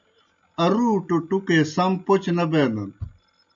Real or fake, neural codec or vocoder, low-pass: real; none; 7.2 kHz